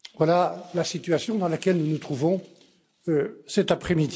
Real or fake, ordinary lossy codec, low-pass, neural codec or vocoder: real; none; none; none